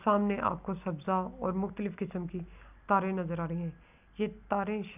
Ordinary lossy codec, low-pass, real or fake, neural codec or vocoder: none; 3.6 kHz; real; none